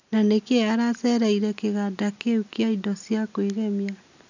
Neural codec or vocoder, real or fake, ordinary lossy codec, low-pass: none; real; none; 7.2 kHz